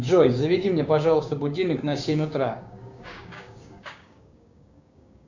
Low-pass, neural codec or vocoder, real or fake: 7.2 kHz; codec, 16 kHz, 6 kbps, DAC; fake